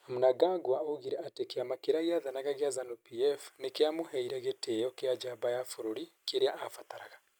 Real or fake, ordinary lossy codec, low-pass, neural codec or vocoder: real; none; none; none